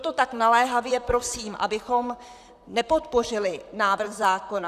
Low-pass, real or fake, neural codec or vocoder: 14.4 kHz; fake; vocoder, 44.1 kHz, 128 mel bands, Pupu-Vocoder